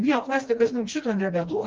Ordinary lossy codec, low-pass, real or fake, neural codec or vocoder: Opus, 16 kbps; 7.2 kHz; fake; codec, 16 kHz, 1 kbps, FreqCodec, smaller model